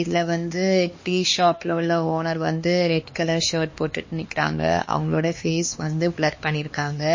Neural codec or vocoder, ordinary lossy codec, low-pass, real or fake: codec, 16 kHz, 2 kbps, X-Codec, HuBERT features, trained on LibriSpeech; MP3, 32 kbps; 7.2 kHz; fake